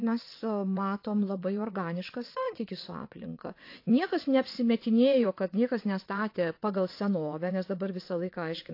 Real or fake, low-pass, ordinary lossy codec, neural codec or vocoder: fake; 5.4 kHz; AAC, 32 kbps; vocoder, 22.05 kHz, 80 mel bands, WaveNeXt